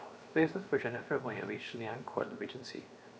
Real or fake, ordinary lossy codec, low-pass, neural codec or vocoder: fake; none; none; codec, 16 kHz, 0.7 kbps, FocalCodec